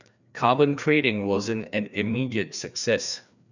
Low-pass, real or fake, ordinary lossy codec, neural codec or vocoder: 7.2 kHz; fake; none; codec, 16 kHz, 2 kbps, FreqCodec, larger model